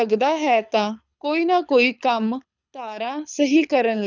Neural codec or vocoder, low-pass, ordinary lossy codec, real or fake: codec, 24 kHz, 6 kbps, HILCodec; 7.2 kHz; none; fake